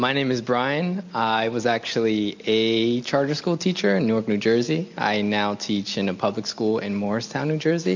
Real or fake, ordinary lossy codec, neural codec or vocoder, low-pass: real; MP3, 64 kbps; none; 7.2 kHz